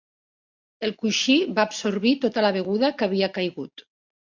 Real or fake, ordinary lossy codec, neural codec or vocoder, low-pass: real; MP3, 64 kbps; none; 7.2 kHz